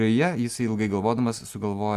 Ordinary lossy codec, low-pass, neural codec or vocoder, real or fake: Opus, 32 kbps; 14.4 kHz; none; real